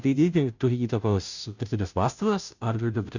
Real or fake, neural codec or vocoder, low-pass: fake; codec, 16 kHz, 0.5 kbps, FunCodec, trained on Chinese and English, 25 frames a second; 7.2 kHz